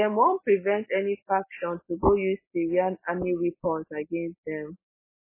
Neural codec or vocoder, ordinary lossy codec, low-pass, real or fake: none; MP3, 16 kbps; 3.6 kHz; real